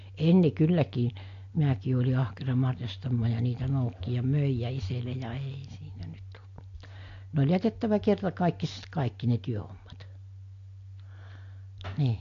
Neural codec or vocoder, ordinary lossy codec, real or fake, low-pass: none; AAC, 48 kbps; real; 7.2 kHz